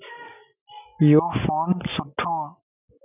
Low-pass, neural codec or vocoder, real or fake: 3.6 kHz; none; real